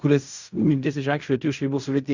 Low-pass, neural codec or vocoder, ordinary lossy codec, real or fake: 7.2 kHz; codec, 16 kHz in and 24 kHz out, 0.4 kbps, LongCat-Audio-Codec, fine tuned four codebook decoder; Opus, 64 kbps; fake